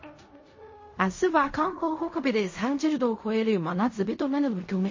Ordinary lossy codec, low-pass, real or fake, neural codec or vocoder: MP3, 32 kbps; 7.2 kHz; fake; codec, 16 kHz in and 24 kHz out, 0.4 kbps, LongCat-Audio-Codec, fine tuned four codebook decoder